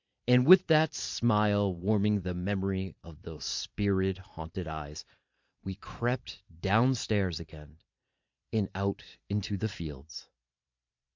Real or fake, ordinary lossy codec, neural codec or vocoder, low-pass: fake; MP3, 64 kbps; vocoder, 44.1 kHz, 128 mel bands every 512 samples, BigVGAN v2; 7.2 kHz